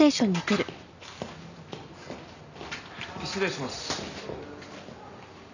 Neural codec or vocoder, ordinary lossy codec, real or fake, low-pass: none; none; real; 7.2 kHz